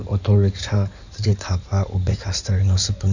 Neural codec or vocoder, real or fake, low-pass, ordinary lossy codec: codec, 16 kHz in and 24 kHz out, 2.2 kbps, FireRedTTS-2 codec; fake; 7.2 kHz; none